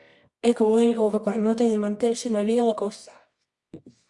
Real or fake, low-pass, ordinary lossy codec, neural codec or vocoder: fake; 10.8 kHz; Opus, 64 kbps; codec, 24 kHz, 0.9 kbps, WavTokenizer, medium music audio release